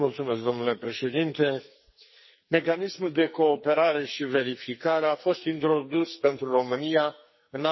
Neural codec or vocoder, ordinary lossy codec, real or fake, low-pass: codec, 44.1 kHz, 2.6 kbps, SNAC; MP3, 24 kbps; fake; 7.2 kHz